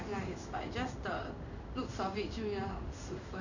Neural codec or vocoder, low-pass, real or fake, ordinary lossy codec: codec, 16 kHz in and 24 kHz out, 1 kbps, XY-Tokenizer; 7.2 kHz; fake; none